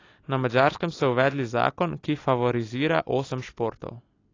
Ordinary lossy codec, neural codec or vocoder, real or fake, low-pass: AAC, 32 kbps; none; real; 7.2 kHz